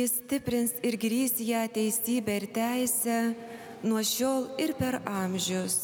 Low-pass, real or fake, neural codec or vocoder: 19.8 kHz; real; none